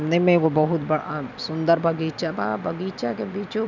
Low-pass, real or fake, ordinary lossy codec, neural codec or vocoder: 7.2 kHz; real; none; none